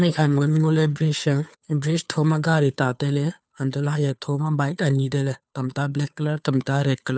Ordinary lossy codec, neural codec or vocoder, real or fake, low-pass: none; codec, 16 kHz, 2 kbps, FunCodec, trained on Chinese and English, 25 frames a second; fake; none